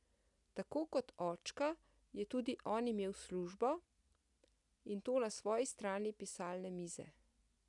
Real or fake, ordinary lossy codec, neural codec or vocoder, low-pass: real; none; none; 10.8 kHz